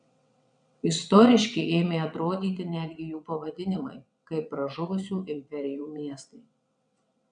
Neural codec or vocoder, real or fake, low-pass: vocoder, 22.05 kHz, 80 mel bands, WaveNeXt; fake; 9.9 kHz